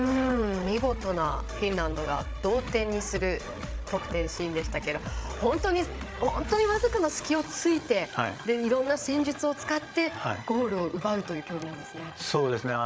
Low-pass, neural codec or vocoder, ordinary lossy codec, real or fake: none; codec, 16 kHz, 8 kbps, FreqCodec, larger model; none; fake